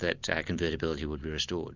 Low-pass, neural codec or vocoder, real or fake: 7.2 kHz; none; real